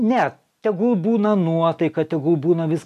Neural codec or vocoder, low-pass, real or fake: none; 14.4 kHz; real